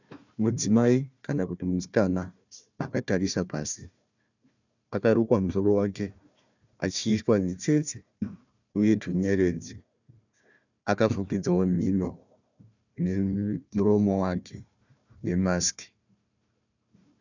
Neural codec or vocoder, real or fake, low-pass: codec, 16 kHz, 1 kbps, FunCodec, trained on Chinese and English, 50 frames a second; fake; 7.2 kHz